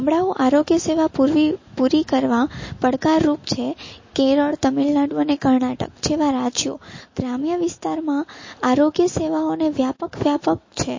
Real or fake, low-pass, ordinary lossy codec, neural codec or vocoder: real; 7.2 kHz; MP3, 32 kbps; none